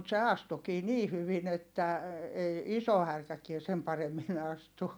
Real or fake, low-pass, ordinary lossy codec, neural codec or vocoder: real; none; none; none